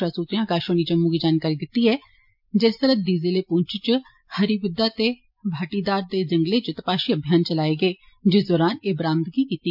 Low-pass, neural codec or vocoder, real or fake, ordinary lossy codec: 5.4 kHz; none; real; MP3, 48 kbps